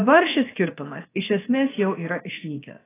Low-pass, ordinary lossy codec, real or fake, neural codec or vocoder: 3.6 kHz; AAC, 16 kbps; fake; codec, 16 kHz, 0.7 kbps, FocalCodec